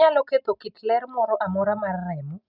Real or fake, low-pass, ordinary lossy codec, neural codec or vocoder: real; 5.4 kHz; none; none